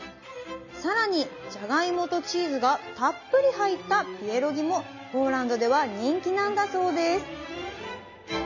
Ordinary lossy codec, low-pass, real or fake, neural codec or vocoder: none; 7.2 kHz; real; none